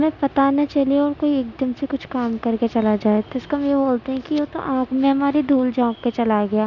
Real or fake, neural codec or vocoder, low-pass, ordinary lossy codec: real; none; 7.2 kHz; none